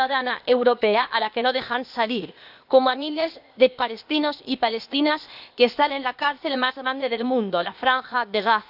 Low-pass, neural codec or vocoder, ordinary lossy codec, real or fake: 5.4 kHz; codec, 16 kHz, 0.8 kbps, ZipCodec; none; fake